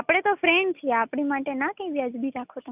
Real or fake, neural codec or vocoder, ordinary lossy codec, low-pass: real; none; none; 3.6 kHz